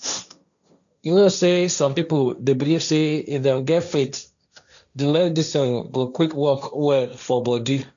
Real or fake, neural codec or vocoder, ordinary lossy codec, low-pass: fake; codec, 16 kHz, 1.1 kbps, Voila-Tokenizer; none; 7.2 kHz